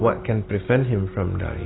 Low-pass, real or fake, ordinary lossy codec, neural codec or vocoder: 7.2 kHz; real; AAC, 16 kbps; none